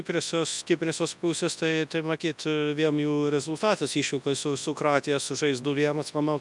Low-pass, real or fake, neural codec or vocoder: 10.8 kHz; fake; codec, 24 kHz, 0.9 kbps, WavTokenizer, large speech release